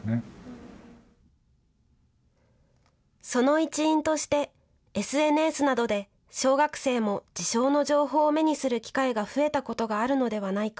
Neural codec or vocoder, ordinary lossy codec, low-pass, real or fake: none; none; none; real